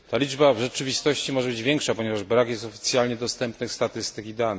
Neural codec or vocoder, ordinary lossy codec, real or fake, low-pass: none; none; real; none